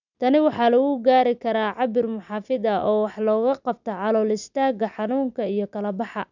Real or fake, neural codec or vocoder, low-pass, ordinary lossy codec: real; none; 7.2 kHz; none